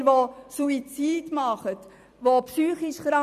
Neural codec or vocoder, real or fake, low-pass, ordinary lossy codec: vocoder, 44.1 kHz, 128 mel bands every 256 samples, BigVGAN v2; fake; 14.4 kHz; none